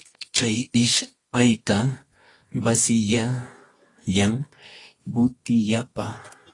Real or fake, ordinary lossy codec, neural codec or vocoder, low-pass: fake; AAC, 32 kbps; codec, 24 kHz, 0.9 kbps, WavTokenizer, medium music audio release; 10.8 kHz